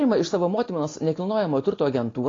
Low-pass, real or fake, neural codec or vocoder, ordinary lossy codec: 7.2 kHz; real; none; AAC, 32 kbps